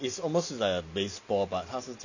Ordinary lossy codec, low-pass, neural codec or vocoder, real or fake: none; 7.2 kHz; none; real